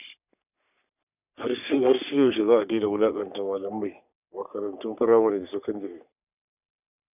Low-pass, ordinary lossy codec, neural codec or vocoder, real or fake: 3.6 kHz; none; codec, 44.1 kHz, 3.4 kbps, Pupu-Codec; fake